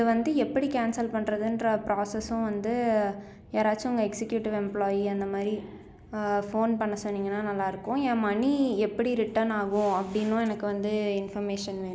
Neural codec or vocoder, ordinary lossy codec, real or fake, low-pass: none; none; real; none